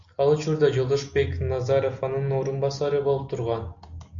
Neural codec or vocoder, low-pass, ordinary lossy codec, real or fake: none; 7.2 kHz; AAC, 64 kbps; real